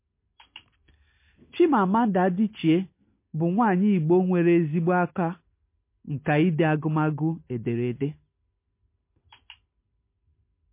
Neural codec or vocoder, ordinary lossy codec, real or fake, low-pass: none; MP3, 24 kbps; real; 3.6 kHz